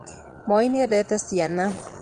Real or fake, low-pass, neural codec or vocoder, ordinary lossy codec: fake; 9.9 kHz; vocoder, 22.05 kHz, 80 mel bands, Vocos; Opus, 24 kbps